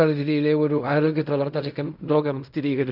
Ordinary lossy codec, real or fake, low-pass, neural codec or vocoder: none; fake; 5.4 kHz; codec, 16 kHz in and 24 kHz out, 0.4 kbps, LongCat-Audio-Codec, fine tuned four codebook decoder